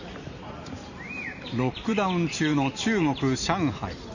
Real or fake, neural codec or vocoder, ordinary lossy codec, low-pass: fake; vocoder, 44.1 kHz, 128 mel bands every 512 samples, BigVGAN v2; none; 7.2 kHz